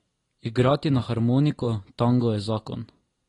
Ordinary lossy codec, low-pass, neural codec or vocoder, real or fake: AAC, 32 kbps; 10.8 kHz; none; real